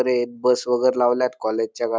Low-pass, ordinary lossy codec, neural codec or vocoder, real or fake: none; none; none; real